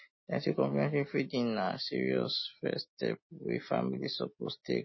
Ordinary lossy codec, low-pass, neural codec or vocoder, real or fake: MP3, 24 kbps; 7.2 kHz; none; real